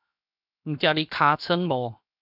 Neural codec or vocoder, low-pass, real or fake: codec, 16 kHz, 0.7 kbps, FocalCodec; 5.4 kHz; fake